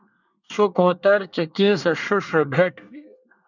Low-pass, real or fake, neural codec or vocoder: 7.2 kHz; fake; codec, 24 kHz, 1 kbps, SNAC